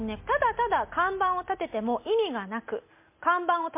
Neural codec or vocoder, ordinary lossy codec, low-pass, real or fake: none; MP3, 24 kbps; 3.6 kHz; real